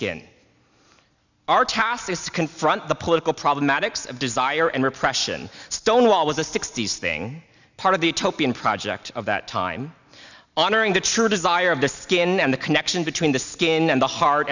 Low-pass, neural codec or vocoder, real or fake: 7.2 kHz; none; real